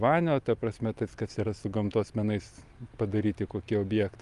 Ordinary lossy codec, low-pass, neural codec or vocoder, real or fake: Opus, 32 kbps; 10.8 kHz; none; real